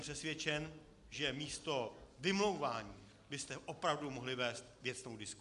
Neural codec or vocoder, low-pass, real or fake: none; 10.8 kHz; real